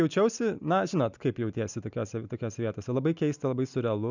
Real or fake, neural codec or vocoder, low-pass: real; none; 7.2 kHz